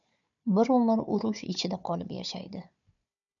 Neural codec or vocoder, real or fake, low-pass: codec, 16 kHz, 4 kbps, FunCodec, trained on Chinese and English, 50 frames a second; fake; 7.2 kHz